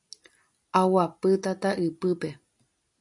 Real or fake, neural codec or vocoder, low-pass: real; none; 10.8 kHz